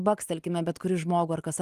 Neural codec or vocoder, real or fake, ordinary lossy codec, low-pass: none; real; Opus, 24 kbps; 14.4 kHz